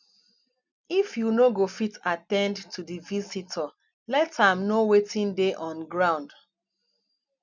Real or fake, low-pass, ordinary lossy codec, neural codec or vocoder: real; 7.2 kHz; none; none